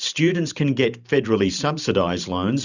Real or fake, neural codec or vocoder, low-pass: fake; vocoder, 44.1 kHz, 128 mel bands every 512 samples, BigVGAN v2; 7.2 kHz